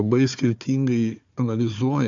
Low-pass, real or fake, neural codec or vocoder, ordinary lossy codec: 7.2 kHz; fake; codec, 16 kHz, 4 kbps, FunCodec, trained on Chinese and English, 50 frames a second; MP3, 64 kbps